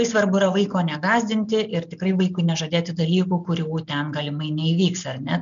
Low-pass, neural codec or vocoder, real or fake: 7.2 kHz; none; real